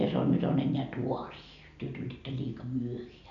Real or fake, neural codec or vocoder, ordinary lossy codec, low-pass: real; none; none; 7.2 kHz